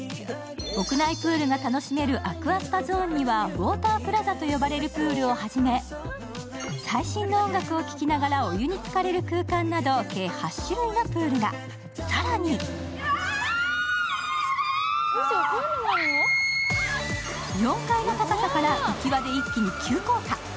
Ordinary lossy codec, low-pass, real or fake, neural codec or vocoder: none; none; real; none